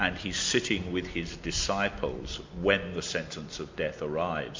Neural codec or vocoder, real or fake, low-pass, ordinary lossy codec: none; real; 7.2 kHz; MP3, 48 kbps